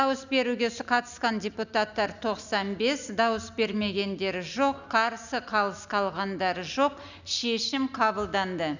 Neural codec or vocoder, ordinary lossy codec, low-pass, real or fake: none; none; 7.2 kHz; real